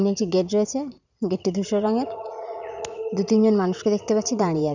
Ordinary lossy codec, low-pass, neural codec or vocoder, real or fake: none; 7.2 kHz; vocoder, 44.1 kHz, 80 mel bands, Vocos; fake